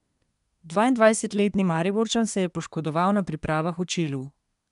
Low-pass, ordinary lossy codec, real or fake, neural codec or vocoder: 10.8 kHz; none; fake; codec, 24 kHz, 1 kbps, SNAC